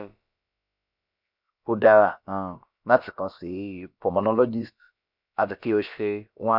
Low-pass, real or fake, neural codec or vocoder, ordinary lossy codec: 5.4 kHz; fake; codec, 16 kHz, about 1 kbps, DyCAST, with the encoder's durations; none